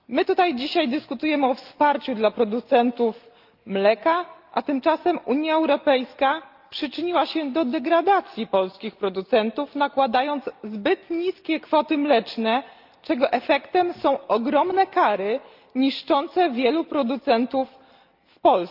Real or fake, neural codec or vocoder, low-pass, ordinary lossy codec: real; none; 5.4 kHz; Opus, 32 kbps